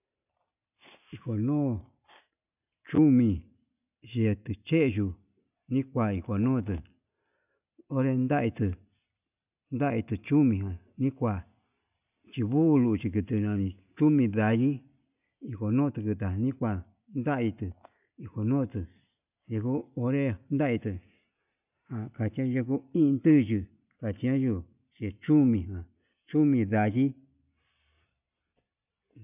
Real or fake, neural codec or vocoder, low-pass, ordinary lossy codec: real; none; 3.6 kHz; none